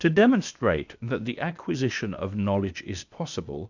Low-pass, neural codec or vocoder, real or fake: 7.2 kHz; codec, 16 kHz, 0.8 kbps, ZipCodec; fake